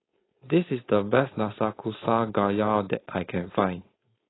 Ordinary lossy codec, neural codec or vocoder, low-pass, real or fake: AAC, 16 kbps; codec, 16 kHz, 4.8 kbps, FACodec; 7.2 kHz; fake